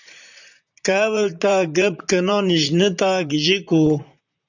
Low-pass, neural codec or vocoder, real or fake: 7.2 kHz; vocoder, 44.1 kHz, 128 mel bands, Pupu-Vocoder; fake